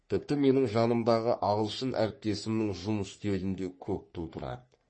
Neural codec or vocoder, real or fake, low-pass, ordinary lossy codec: codec, 44.1 kHz, 3.4 kbps, Pupu-Codec; fake; 9.9 kHz; MP3, 32 kbps